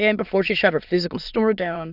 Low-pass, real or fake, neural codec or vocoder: 5.4 kHz; fake; autoencoder, 22.05 kHz, a latent of 192 numbers a frame, VITS, trained on many speakers